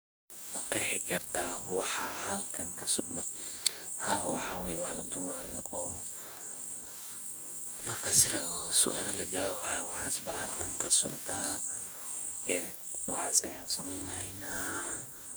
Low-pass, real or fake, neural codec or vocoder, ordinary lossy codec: none; fake; codec, 44.1 kHz, 2.6 kbps, DAC; none